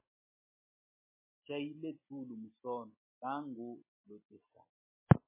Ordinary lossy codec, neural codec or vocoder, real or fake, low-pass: MP3, 16 kbps; none; real; 3.6 kHz